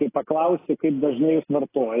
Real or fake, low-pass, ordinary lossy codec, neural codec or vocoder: real; 3.6 kHz; AAC, 16 kbps; none